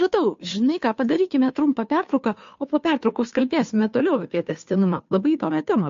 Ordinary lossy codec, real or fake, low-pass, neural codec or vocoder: MP3, 48 kbps; fake; 7.2 kHz; codec, 16 kHz, 2 kbps, FunCodec, trained on Chinese and English, 25 frames a second